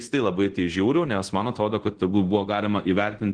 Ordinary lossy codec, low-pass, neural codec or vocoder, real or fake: Opus, 16 kbps; 9.9 kHz; codec, 24 kHz, 0.5 kbps, DualCodec; fake